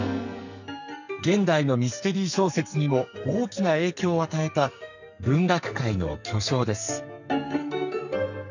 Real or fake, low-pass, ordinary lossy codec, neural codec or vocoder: fake; 7.2 kHz; none; codec, 44.1 kHz, 2.6 kbps, SNAC